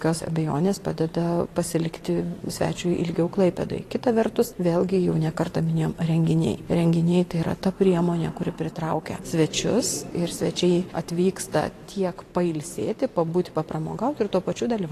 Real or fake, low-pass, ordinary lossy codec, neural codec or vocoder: fake; 14.4 kHz; AAC, 48 kbps; vocoder, 44.1 kHz, 128 mel bands every 512 samples, BigVGAN v2